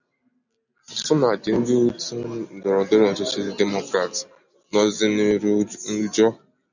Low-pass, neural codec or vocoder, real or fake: 7.2 kHz; none; real